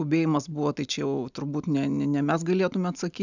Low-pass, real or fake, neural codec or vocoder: 7.2 kHz; real; none